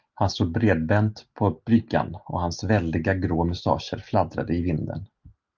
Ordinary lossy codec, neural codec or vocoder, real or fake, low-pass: Opus, 32 kbps; none; real; 7.2 kHz